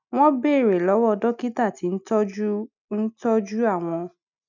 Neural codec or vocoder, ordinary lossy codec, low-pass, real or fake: none; none; 7.2 kHz; real